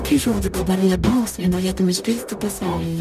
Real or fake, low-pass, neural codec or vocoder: fake; 14.4 kHz; codec, 44.1 kHz, 0.9 kbps, DAC